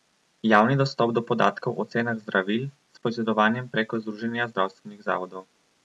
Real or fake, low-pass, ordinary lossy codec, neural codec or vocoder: real; none; none; none